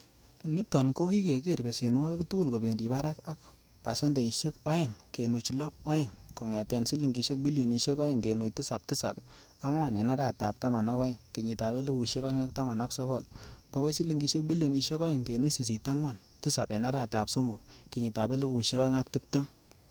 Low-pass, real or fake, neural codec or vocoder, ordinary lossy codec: none; fake; codec, 44.1 kHz, 2.6 kbps, DAC; none